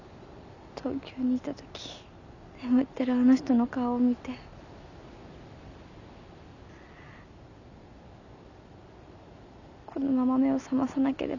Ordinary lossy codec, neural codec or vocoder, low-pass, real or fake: none; none; 7.2 kHz; real